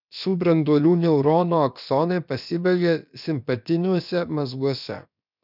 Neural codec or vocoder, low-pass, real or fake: codec, 16 kHz, about 1 kbps, DyCAST, with the encoder's durations; 5.4 kHz; fake